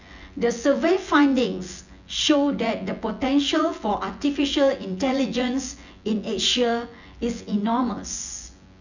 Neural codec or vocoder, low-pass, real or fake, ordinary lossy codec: vocoder, 24 kHz, 100 mel bands, Vocos; 7.2 kHz; fake; none